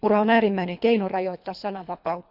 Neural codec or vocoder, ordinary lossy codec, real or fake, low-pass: codec, 24 kHz, 3 kbps, HILCodec; none; fake; 5.4 kHz